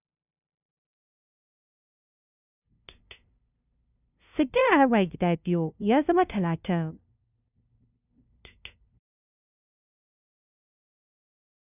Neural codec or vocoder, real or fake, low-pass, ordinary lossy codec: codec, 16 kHz, 0.5 kbps, FunCodec, trained on LibriTTS, 25 frames a second; fake; 3.6 kHz; none